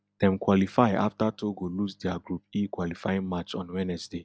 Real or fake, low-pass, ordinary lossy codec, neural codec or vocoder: real; none; none; none